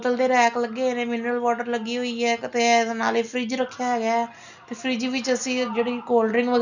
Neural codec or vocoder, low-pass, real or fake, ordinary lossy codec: none; 7.2 kHz; real; none